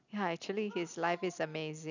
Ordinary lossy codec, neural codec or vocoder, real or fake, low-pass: none; none; real; 7.2 kHz